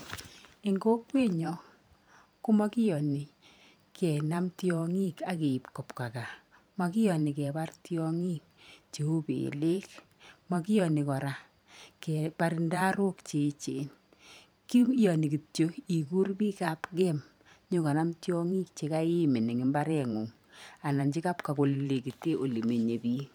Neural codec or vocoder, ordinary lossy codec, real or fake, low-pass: vocoder, 44.1 kHz, 128 mel bands every 512 samples, BigVGAN v2; none; fake; none